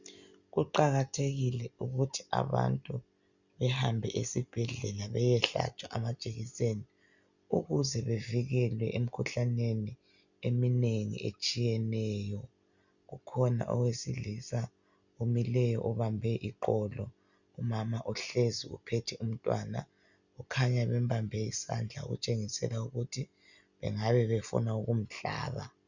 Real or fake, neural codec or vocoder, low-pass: real; none; 7.2 kHz